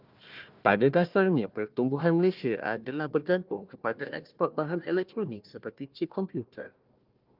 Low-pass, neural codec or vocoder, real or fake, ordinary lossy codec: 5.4 kHz; codec, 16 kHz, 1 kbps, FunCodec, trained on Chinese and English, 50 frames a second; fake; Opus, 32 kbps